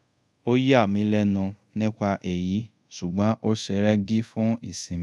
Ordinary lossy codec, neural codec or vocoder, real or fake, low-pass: none; codec, 24 kHz, 0.5 kbps, DualCodec; fake; none